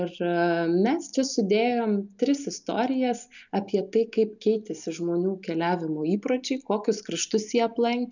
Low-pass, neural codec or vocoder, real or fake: 7.2 kHz; none; real